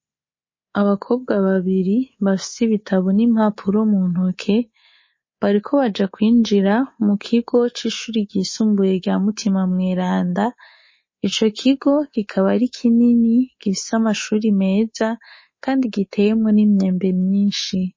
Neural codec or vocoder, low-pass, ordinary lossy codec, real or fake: codec, 24 kHz, 3.1 kbps, DualCodec; 7.2 kHz; MP3, 32 kbps; fake